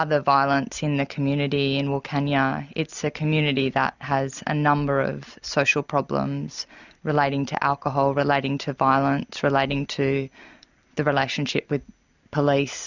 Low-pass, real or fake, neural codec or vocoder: 7.2 kHz; real; none